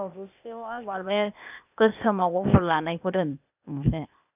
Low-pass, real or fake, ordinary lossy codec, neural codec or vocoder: 3.6 kHz; fake; none; codec, 16 kHz, 0.8 kbps, ZipCodec